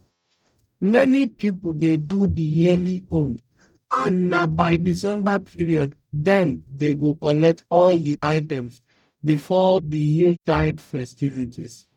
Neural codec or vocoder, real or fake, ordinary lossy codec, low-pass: codec, 44.1 kHz, 0.9 kbps, DAC; fake; none; 19.8 kHz